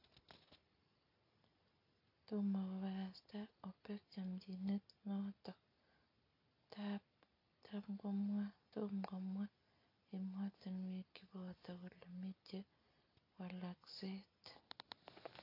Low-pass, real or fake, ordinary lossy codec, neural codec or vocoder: 5.4 kHz; real; AAC, 32 kbps; none